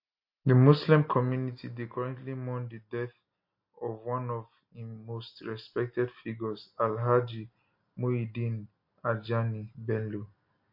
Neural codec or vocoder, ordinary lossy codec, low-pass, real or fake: none; MP3, 32 kbps; 5.4 kHz; real